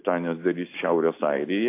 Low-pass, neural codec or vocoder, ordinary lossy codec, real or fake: 3.6 kHz; none; AAC, 24 kbps; real